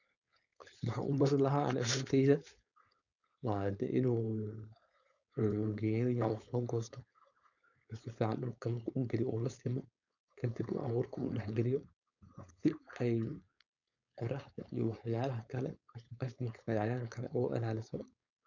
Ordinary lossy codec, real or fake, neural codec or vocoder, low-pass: none; fake; codec, 16 kHz, 4.8 kbps, FACodec; 7.2 kHz